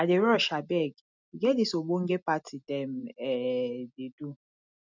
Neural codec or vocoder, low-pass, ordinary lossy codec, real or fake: none; 7.2 kHz; none; real